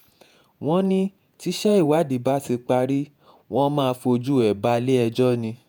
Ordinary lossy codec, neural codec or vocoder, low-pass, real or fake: none; vocoder, 48 kHz, 128 mel bands, Vocos; none; fake